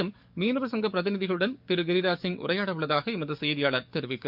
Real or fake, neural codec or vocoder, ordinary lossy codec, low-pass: fake; codec, 44.1 kHz, 7.8 kbps, DAC; none; 5.4 kHz